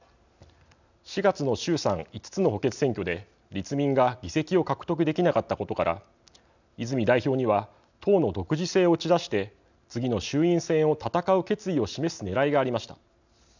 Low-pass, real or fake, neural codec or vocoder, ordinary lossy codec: 7.2 kHz; real; none; none